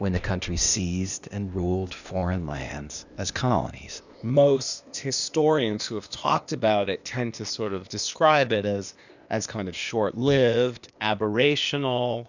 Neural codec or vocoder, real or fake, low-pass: codec, 16 kHz, 0.8 kbps, ZipCodec; fake; 7.2 kHz